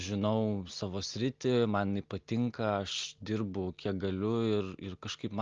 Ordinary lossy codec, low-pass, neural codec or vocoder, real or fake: Opus, 24 kbps; 7.2 kHz; none; real